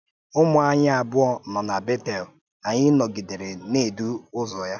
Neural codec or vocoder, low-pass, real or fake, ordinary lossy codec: none; 7.2 kHz; real; none